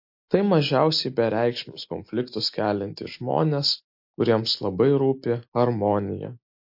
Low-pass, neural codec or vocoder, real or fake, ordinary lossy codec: 5.4 kHz; none; real; MP3, 32 kbps